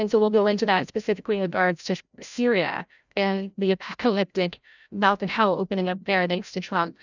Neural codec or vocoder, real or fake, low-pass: codec, 16 kHz, 0.5 kbps, FreqCodec, larger model; fake; 7.2 kHz